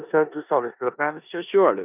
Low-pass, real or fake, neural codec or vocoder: 3.6 kHz; fake; codec, 16 kHz in and 24 kHz out, 0.9 kbps, LongCat-Audio-Codec, four codebook decoder